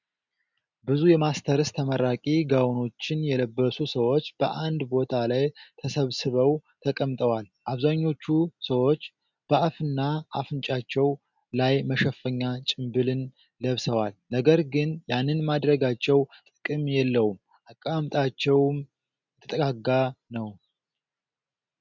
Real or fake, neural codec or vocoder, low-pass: real; none; 7.2 kHz